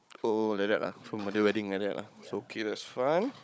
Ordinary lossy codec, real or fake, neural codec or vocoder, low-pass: none; fake; codec, 16 kHz, 16 kbps, FunCodec, trained on Chinese and English, 50 frames a second; none